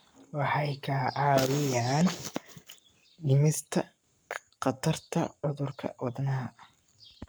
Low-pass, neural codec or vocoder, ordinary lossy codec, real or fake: none; codec, 44.1 kHz, 7.8 kbps, Pupu-Codec; none; fake